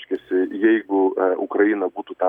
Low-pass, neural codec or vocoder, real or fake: 19.8 kHz; none; real